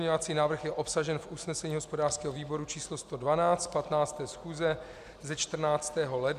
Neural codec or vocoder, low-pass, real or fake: none; 14.4 kHz; real